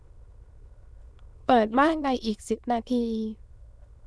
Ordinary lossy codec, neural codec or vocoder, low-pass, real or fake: none; autoencoder, 22.05 kHz, a latent of 192 numbers a frame, VITS, trained on many speakers; none; fake